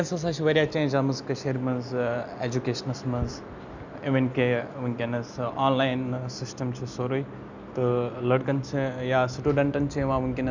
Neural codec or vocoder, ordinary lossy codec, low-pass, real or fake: none; none; 7.2 kHz; real